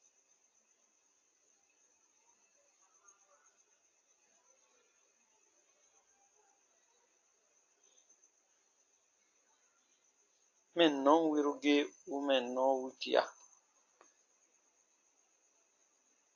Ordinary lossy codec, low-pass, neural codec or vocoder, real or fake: AAC, 48 kbps; 7.2 kHz; none; real